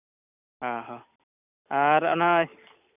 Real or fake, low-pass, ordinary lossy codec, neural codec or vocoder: real; 3.6 kHz; none; none